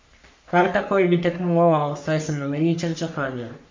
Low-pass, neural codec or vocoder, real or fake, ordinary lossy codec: 7.2 kHz; codec, 44.1 kHz, 3.4 kbps, Pupu-Codec; fake; MP3, 48 kbps